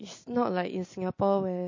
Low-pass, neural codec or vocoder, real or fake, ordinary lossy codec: 7.2 kHz; none; real; MP3, 32 kbps